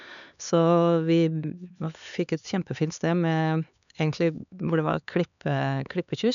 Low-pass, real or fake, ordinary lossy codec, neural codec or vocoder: 7.2 kHz; fake; none; codec, 16 kHz, 4 kbps, X-Codec, HuBERT features, trained on LibriSpeech